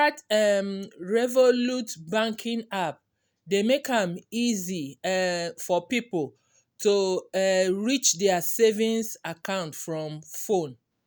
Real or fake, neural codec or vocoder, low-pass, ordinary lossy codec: real; none; none; none